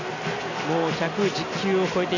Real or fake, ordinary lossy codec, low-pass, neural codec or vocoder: real; none; 7.2 kHz; none